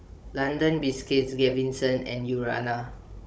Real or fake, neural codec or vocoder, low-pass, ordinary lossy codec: fake; codec, 16 kHz, 16 kbps, FunCodec, trained on Chinese and English, 50 frames a second; none; none